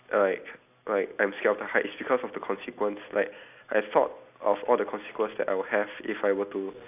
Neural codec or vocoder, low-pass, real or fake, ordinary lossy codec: none; 3.6 kHz; real; none